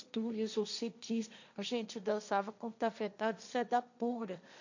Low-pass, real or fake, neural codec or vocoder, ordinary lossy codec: 7.2 kHz; fake; codec, 16 kHz, 1.1 kbps, Voila-Tokenizer; none